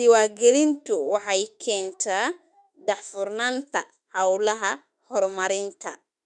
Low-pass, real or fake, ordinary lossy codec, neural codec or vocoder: 10.8 kHz; fake; none; autoencoder, 48 kHz, 32 numbers a frame, DAC-VAE, trained on Japanese speech